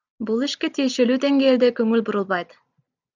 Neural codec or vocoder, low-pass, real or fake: none; 7.2 kHz; real